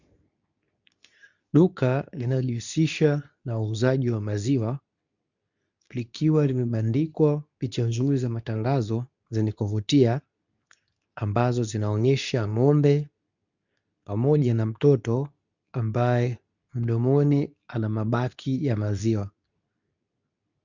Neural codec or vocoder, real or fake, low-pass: codec, 24 kHz, 0.9 kbps, WavTokenizer, medium speech release version 2; fake; 7.2 kHz